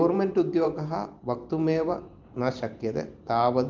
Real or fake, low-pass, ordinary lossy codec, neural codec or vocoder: fake; 7.2 kHz; Opus, 32 kbps; vocoder, 44.1 kHz, 128 mel bands every 512 samples, BigVGAN v2